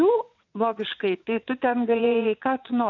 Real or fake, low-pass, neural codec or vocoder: fake; 7.2 kHz; vocoder, 22.05 kHz, 80 mel bands, WaveNeXt